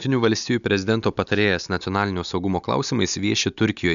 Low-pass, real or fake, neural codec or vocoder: 7.2 kHz; real; none